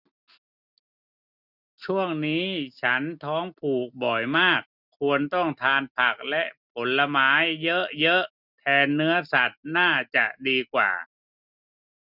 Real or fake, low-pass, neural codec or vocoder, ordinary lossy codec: real; 5.4 kHz; none; none